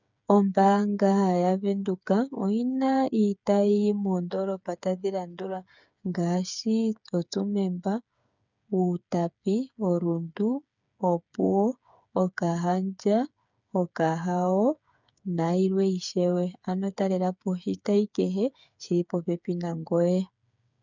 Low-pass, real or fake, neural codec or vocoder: 7.2 kHz; fake; codec, 16 kHz, 8 kbps, FreqCodec, smaller model